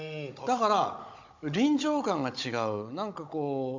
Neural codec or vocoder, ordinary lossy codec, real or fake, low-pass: codec, 16 kHz, 16 kbps, FunCodec, trained on Chinese and English, 50 frames a second; MP3, 48 kbps; fake; 7.2 kHz